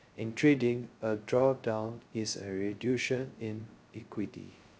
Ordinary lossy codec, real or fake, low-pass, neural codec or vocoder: none; fake; none; codec, 16 kHz, 0.2 kbps, FocalCodec